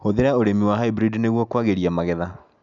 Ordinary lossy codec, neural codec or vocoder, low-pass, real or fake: none; none; 7.2 kHz; real